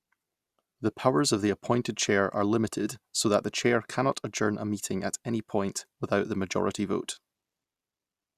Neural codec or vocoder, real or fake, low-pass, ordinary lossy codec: none; real; 14.4 kHz; Opus, 64 kbps